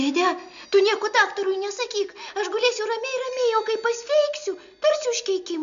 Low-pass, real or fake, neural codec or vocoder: 7.2 kHz; real; none